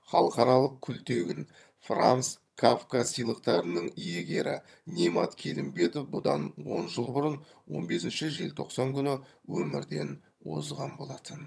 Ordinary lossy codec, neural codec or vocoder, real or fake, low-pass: none; vocoder, 22.05 kHz, 80 mel bands, HiFi-GAN; fake; none